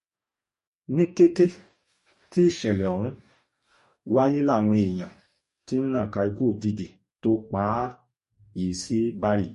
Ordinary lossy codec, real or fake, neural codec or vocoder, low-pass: MP3, 48 kbps; fake; codec, 44.1 kHz, 2.6 kbps, DAC; 14.4 kHz